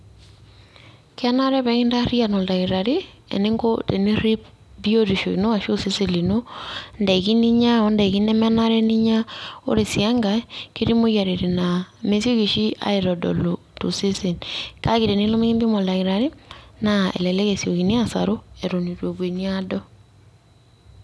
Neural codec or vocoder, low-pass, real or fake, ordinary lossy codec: none; none; real; none